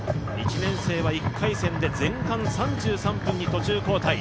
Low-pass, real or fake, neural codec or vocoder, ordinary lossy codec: none; real; none; none